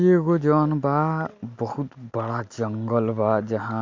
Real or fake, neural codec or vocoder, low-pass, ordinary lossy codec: real; none; 7.2 kHz; MP3, 48 kbps